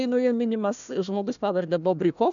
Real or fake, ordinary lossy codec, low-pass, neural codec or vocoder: fake; MP3, 96 kbps; 7.2 kHz; codec, 16 kHz, 1 kbps, FunCodec, trained on Chinese and English, 50 frames a second